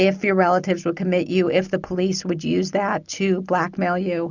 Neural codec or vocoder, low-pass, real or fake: none; 7.2 kHz; real